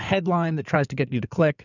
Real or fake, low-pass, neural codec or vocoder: fake; 7.2 kHz; codec, 16 kHz in and 24 kHz out, 2.2 kbps, FireRedTTS-2 codec